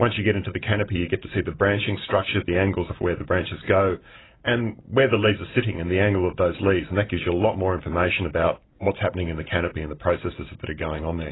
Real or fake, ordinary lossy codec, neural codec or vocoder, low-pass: real; AAC, 16 kbps; none; 7.2 kHz